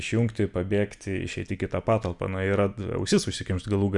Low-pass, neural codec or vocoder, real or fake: 10.8 kHz; none; real